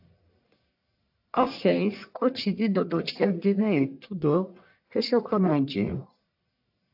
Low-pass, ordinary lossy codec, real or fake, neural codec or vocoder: 5.4 kHz; AAC, 48 kbps; fake; codec, 44.1 kHz, 1.7 kbps, Pupu-Codec